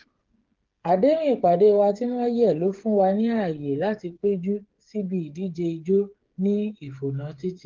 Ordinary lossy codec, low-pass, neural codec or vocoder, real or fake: Opus, 16 kbps; 7.2 kHz; codec, 16 kHz, 8 kbps, FreqCodec, smaller model; fake